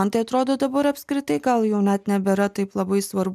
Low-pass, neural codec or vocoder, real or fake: 14.4 kHz; none; real